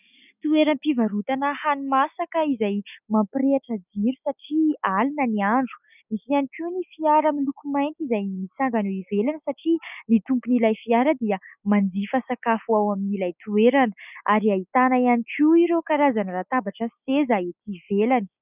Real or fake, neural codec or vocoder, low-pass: real; none; 3.6 kHz